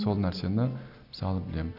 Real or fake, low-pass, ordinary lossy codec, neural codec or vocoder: real; 5.4 kHz; none; none